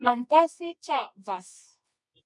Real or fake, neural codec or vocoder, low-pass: fake; codec, 24 kHz, 0.9 kbps, WavTokenizer, medium music audio release; 10.8 kHz